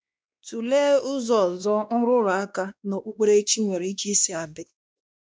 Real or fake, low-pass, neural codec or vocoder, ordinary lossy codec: fake; none; codec, 16 kHz, 2 kbps, X-Codec, WavLM features, trained on Multilingual LibriSpeech; none